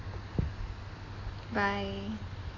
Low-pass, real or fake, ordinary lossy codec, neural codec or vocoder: 7.2 kHz; real; AAC, 32 kbps; none